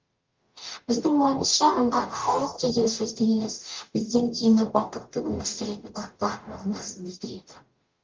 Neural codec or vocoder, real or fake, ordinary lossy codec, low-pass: codec, 44.1 kHz, 0.9 kbps, DAC; fake; Opus, 32 kbps; 7.2 kHz